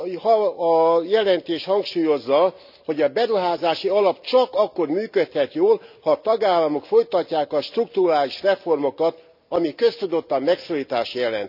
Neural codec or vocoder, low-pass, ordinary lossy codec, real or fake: none; 5.4 kHz; none; real